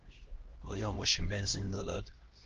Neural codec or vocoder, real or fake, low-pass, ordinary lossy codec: codec, 16 kHz, 1 kbps, X-Codec, HuBERT features, trained on LibriSpeech; fake; 7.2 kHz; Opus, 16 kbps